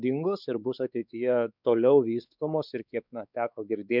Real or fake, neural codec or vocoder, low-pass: fake; codec, 16 kHz, 4 kbps, X-Codec, WavLM features, trained on Multilingual LibriSpeech; 5.4 kHz